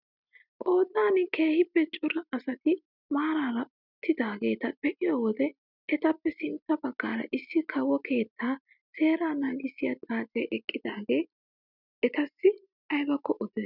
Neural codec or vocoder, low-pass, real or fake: none; 5.4 kHz; real